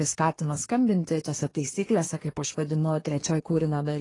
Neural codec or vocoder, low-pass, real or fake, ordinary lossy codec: codec, 24 kHz, 1 kbps, SNAC; 10.8 kHz; fake; AAC, 32 kbps